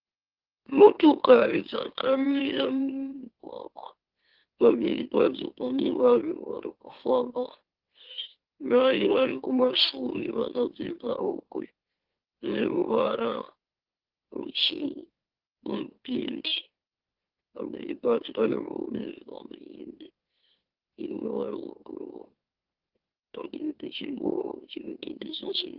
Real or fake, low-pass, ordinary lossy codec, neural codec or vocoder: fake; 5.4 kHz; Opus, 16 kbps; autoencoder, 44.1 kHz, a latent of 192 numbers a frame, MeloTTS